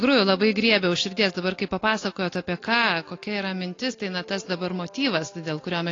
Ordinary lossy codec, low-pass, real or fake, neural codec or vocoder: AAC, 32 kbps; 7.2 kHz; real; none